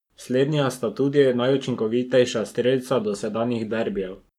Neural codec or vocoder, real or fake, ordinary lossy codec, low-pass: codec, 44.1 kHz, 7.8 kbps, Pupu-Codec; fake; none; 19.8 kHz